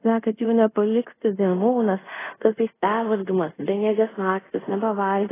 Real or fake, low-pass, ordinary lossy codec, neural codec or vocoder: fake; 3.6 kHz; AAC, 16 kbps; codec, 24 kHz, 0.5 kbps, DualCodec